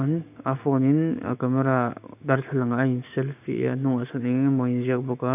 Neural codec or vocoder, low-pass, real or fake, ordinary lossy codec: codec, 16 kHz, 6 kbps, DAC; 3.6 kHz; fake; none